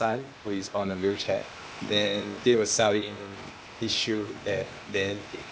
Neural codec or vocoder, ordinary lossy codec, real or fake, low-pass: codec, 16 kHz, 0.8 kbps, ZipCodec; none; fake; none